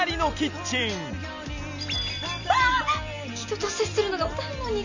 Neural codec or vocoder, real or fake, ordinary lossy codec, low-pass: none; real; none; 7.2 kHz